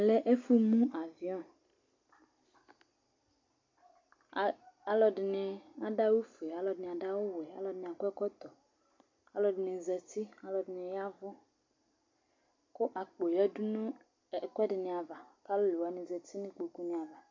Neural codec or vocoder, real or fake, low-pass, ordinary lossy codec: none; real; 7.2 kHz; MP3, 48 kbps